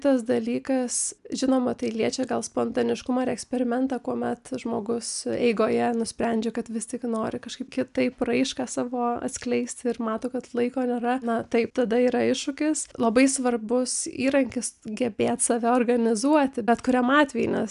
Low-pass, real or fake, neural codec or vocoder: 10.8 kHz; real; none